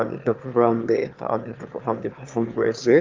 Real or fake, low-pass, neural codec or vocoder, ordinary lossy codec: fake; 7.2 kHz; autoencoder, 22.05 kHz, a latent of 192 numbers a frame, VITS, trained on one speaker; Opus, 32 kbps